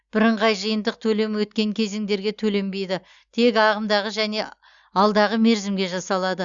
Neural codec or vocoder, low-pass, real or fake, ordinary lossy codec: none; 7.2 kHz; real; Opus, 64 kbps